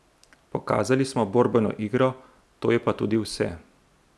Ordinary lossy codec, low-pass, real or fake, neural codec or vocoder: none; none; real; none